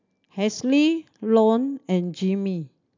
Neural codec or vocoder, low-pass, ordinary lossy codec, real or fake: none; 7.2 kHz; MP3, 64 kbps; real